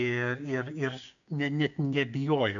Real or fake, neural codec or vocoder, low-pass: fake; codec, 16 kHz, 6 kbps, DAC; 7.2 kHz